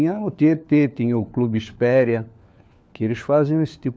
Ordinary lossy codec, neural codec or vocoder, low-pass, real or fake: none; codec, 16 kHz, 4 kbps, FunCodec, trained on LibriTTS, 50 frames a second; none; fake